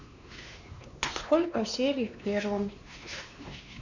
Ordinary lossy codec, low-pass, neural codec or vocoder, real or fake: none; 7.2 kHz; codec, 16 kHz, 2 kbps, X-Codec, WavLM features, trained on Multilingual LibriSpeech; fake